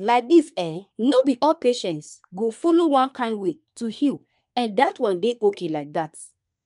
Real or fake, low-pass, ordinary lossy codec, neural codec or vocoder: fake; 10.8 kHz; none; codec, 24 kHz, 1 kbps, SNAC